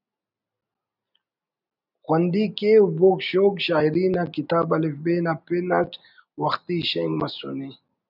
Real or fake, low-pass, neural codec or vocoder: real; 5.4 kHz; none